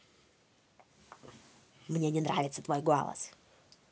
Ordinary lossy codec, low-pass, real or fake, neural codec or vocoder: none; none; real; none